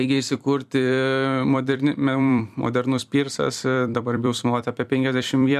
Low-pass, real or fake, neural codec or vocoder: 14.4 kHz; fake; vocoder, 44.1 kHz, 128 mel bands every 256 samples, BigVGAN v2